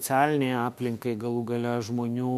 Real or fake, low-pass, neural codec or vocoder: fake; 14.4 kHz; autoencoder, 48 kHz, 32 numbers a frame, DAC-VAE, trained on Japanese speech